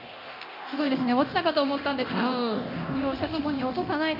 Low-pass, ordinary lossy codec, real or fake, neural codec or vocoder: 5.4 kHz; none; fake; codec, 24 kHz, 0.9 kbps, DualCodec